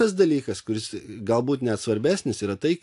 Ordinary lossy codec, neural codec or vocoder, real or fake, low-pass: AAC, 64 kbps; none; real; 10.8 kHz